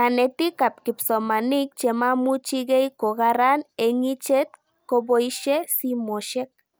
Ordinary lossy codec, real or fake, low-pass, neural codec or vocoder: none; real; none; none